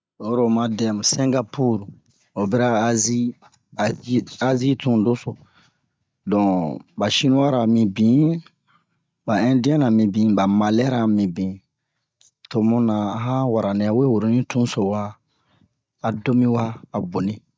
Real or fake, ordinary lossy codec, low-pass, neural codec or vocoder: real; none; none; none